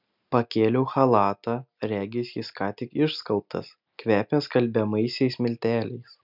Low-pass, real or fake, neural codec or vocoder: 5.4 kHz; real; none